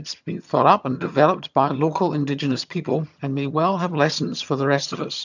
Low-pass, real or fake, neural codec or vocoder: 7.2 kHz; fake; vocoder, 22.05 kHz, 80 mel bands, HiFi-GAN